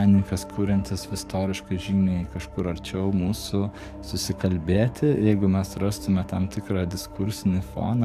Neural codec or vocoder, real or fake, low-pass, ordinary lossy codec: codec, 44.1 kHz, 7.8 kbps, DAC; fake; 14.4 kHz; MP3, 96 kbps